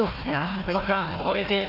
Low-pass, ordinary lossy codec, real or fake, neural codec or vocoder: 5.4 kHz; none; fake; codec, 16 kHz, 1 kbps, FunCodec, trained on Chinese and English, 50 frames a second